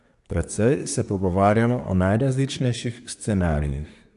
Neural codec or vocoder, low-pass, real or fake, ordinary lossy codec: codec, 24 kHz, 1 kbps, SNAC; 10.8 kHz; fake; none